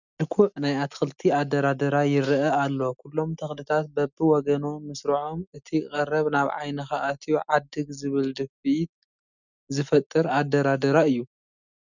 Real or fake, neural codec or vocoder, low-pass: real; none; 7.2 kHz